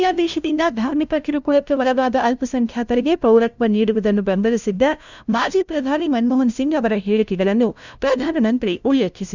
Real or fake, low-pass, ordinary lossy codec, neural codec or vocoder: fake; 7.2 kHz; none; codec, 16 kHz, 0.5 kbps, FunCodec, trained on LibriTTS, 25 frames a second